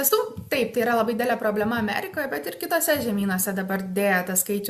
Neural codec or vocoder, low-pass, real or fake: none; 14.4 kHz; real